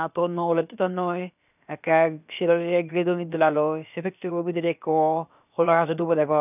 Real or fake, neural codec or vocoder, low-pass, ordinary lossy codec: fake; codec, 16 kHz, about 1 kbps, DyCAST, with the encoder's durations; 3.6 kHz; none